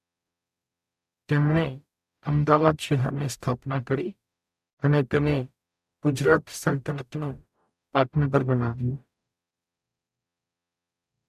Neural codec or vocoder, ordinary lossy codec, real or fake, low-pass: codec, 44.1 kHz, 0.9 kbps, DAC; MP3, 96 kbps; fake; 14.4 kHz